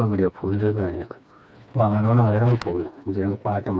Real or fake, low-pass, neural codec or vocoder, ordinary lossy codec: fake; none; codec, 16 kHz, 2 kbps, FreqCodec, smaller model; none